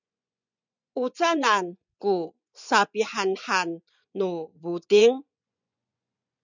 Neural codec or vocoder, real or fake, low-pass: vocoder, 24 kHz, 100 mel bands, Vocos; fake; 7.2 kHz